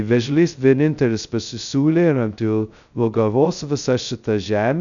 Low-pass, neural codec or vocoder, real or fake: 7.2 kHz; codec, 16 kHz, 0.2 kbps, FocalCodec; fake